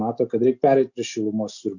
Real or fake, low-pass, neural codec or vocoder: real; 7.2 kHz; none